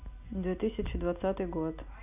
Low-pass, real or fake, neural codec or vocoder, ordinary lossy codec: 3.6 kHz; real; none; none